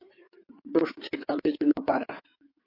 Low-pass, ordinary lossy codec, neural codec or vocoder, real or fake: 5.4 kHz; MP3, 32 kbps; codec, 16 kHz in and 24 kHz out, 2.2 kbps, FireRedTTS-2 codec; fake